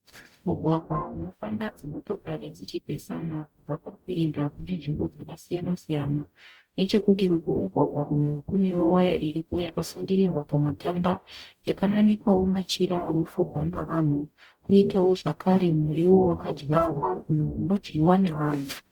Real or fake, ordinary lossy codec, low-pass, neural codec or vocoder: fake; Opus, 64 kbps; 19.8 kHz; codec, 44.1 kHz, 0.9 kbps, DAC